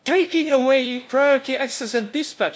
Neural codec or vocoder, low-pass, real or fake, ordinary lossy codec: codec, 16 kHz, 0.5 kbps, FunCodec, trained on LibriTTS, 25 frames a second; none; fake; none